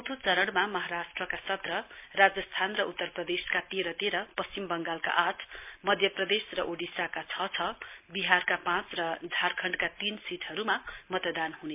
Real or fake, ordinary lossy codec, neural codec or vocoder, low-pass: real; MP3, 32 kbps; none; 3.6 kHz